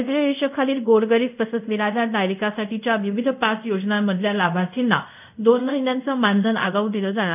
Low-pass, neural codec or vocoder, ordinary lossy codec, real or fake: 3.6 kHz; codec, 24 kHz, 0.5 kbps, DualCodec; none; fake